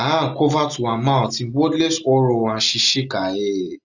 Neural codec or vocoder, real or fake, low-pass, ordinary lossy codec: none; real; 7.2 kHz; none